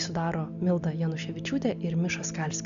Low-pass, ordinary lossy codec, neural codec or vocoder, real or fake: 7.2 kHz; Opus, 64 kbps; none; real